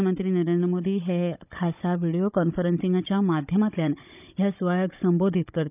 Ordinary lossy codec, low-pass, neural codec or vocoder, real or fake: none; 3.6 kHz; codec, 16 kHz, 16 kbps, FunCodec, trained on Chinese and English, 50 frames a second; fake